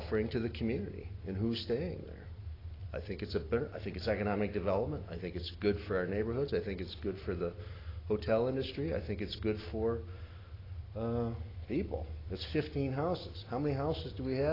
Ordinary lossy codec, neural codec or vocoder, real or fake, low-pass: AAC, 24 kbps; none; real; 5.4 kHz